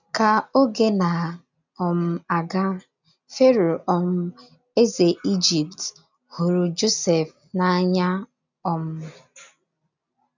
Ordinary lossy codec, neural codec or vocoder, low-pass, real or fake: none; none; 7.2 kHz; real